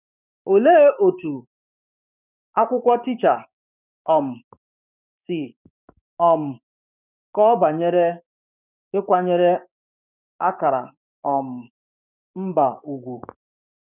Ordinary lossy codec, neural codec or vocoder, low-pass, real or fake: none; codec, 16 kHz, 6 kbps, DAC; 3.6 kHz; fake